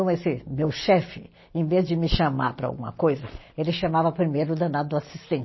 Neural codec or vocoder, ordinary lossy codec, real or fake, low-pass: none; MP3, 24 kbps; real; 7.2 kHz